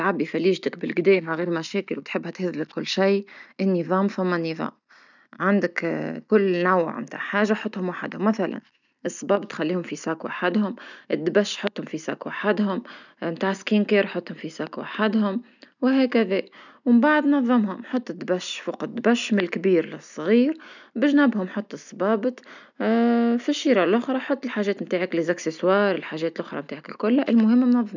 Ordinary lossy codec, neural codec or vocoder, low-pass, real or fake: none; none; 7.2 kHz; real